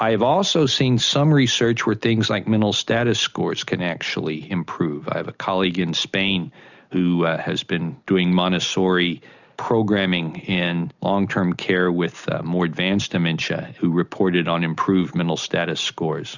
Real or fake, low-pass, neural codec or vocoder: real; 7.2 kHz; none